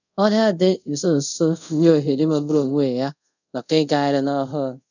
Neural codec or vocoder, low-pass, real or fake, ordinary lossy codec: codec, 24 kHz, 0.5 kbps, DualCodec; 7.2 kHz; fake; none